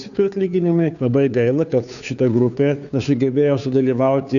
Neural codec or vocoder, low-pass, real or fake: codec, 16 kHz, 2 kbps, FunCodec, trained on Chinese and English, 25 frames a second; 7.2 kHz; fake